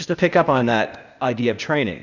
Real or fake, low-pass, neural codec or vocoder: fake; 7.2 kHz; codec, 16 kHz, 0.8 kbps, ZipCodec